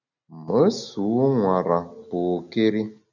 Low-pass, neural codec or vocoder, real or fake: 7.2 kHz; none; real